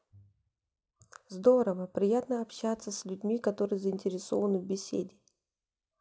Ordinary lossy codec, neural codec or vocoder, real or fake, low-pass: none; none; real; none